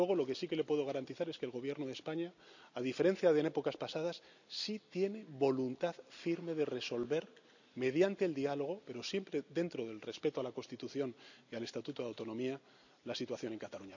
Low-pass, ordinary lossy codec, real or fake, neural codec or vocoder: 7.2 kHz; none; real; none